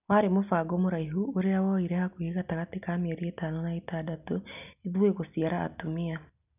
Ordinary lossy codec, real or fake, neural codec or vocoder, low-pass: none; real; none; 3.6 kHz